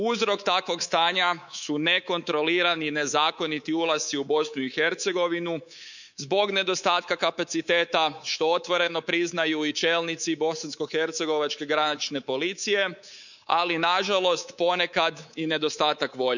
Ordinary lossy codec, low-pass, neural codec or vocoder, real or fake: none; 7.2 kHz; codec, 24 kHz, 3.1 kbps, DualCodec; fake